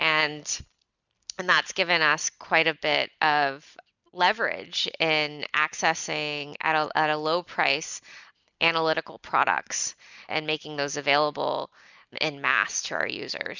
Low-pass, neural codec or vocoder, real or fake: 7.2 kHz; none; real